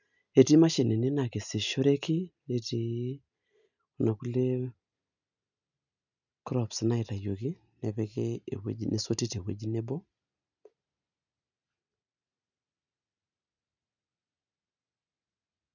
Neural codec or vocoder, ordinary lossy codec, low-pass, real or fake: none; none; 7.2 kHz; real